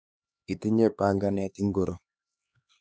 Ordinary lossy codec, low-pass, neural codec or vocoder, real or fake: none; none; codec, 16 kHz, 2 kbps, X-Codec, HuBERT features, trained on LibriSpeech; fake